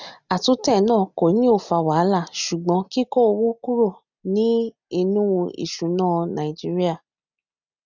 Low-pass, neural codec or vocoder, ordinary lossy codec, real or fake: 7.2 kHz; none; none; real